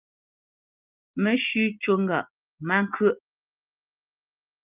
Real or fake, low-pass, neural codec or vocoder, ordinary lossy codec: real; 3.6 kHz; none; Opus, 32 kbps